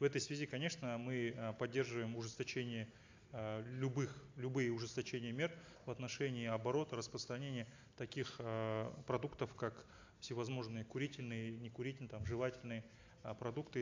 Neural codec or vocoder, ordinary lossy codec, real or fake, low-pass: none; AAC, 48 kbps; real; 7.2 kHz